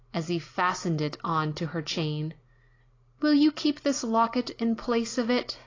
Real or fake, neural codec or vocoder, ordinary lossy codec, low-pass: real; none; AAC, 32 kbps; 7.2 kHz